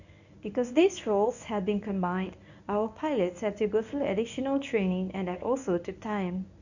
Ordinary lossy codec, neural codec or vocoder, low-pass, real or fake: none; codec, 24 kHz, 0.9 kbps, WavTokenizer, medium speech release version 1; 7.2 kHz; fake